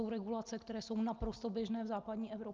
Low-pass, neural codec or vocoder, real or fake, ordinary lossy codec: 7.2 kHz; none; real; Opus, 24 kbps